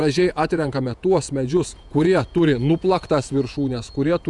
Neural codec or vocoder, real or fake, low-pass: vocoder, 48 kHz, 128 mel bands, Vocos; fake; 10.8 kHz